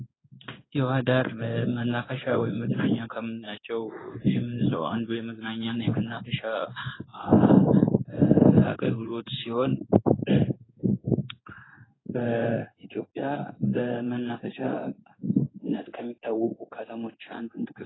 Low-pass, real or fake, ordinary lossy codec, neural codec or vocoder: 7.2 kHz; fake; AAC, 16 kbps; codec, 16 kHz in and 24 kHz out, 1 kbps, XY-Tokenizer